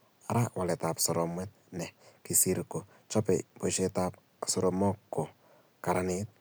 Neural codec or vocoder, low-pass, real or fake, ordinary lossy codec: none; none; real; none